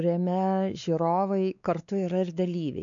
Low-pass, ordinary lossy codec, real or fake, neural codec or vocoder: 7.2 kHz; MP3, 96 kbps; fake; codec, 16 kHz, 4 kbps, X-Codec, WavLM features, trained on Multilingual LibriSpeech